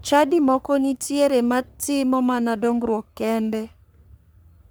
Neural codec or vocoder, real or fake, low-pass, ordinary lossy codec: codec, 44.1 kHz, 3.4 kbps, Pupu-Codec; fake; none; none